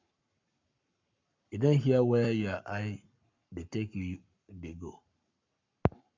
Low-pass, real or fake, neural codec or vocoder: 7.2 kHz; fake; codec, 44.1 kHz, 7.8 kbps, Pupu-Codec